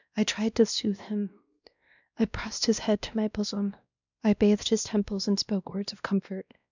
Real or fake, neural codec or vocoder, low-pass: fake; codec, 16 kHz, 1 kbps, X-Codec, WavLM features, trained on Multilingual LibriSpeech; 7.2 kHz